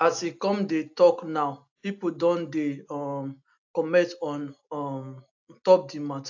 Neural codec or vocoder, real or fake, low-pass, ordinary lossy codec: none; real; 7.2 kHz; AAC, 48 kbps